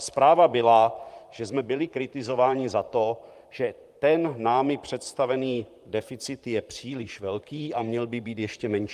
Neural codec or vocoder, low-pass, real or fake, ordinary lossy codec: vocoder, 44.1 kHz, 128 mel bands, Pupu-Vocoder; 14.4 kHz; fake; Opus, 32 kbps